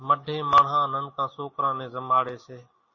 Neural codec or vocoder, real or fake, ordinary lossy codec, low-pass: none; real; MP3, 32 kbps; 7.2 kHz